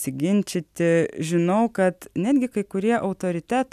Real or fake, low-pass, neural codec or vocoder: real; 14.4 kHz; none